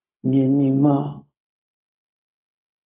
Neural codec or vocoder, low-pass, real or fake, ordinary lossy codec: codec, 16 kHz, 0.4 kbps, LongCat-Audio-Codec; 3.6 kHz; fake; AAC, 32 kbps